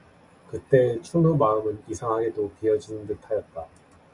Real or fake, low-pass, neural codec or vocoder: real; 10.8 kHz; none